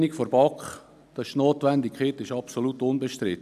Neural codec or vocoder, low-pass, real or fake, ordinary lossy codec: none; 14.4 kHz; real; none